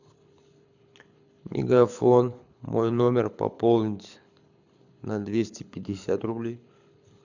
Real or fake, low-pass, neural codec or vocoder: fake; 7.2 kHz; codec, 24 kHz, 6 kbps, HILCodec